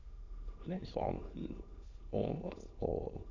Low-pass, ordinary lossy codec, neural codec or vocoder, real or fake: 7.2 kHz; Opus, 64 kbps; autoencoder, 22.05 kHz, a latent of 192 numbers a frame, VITS, trained on many speakers; fake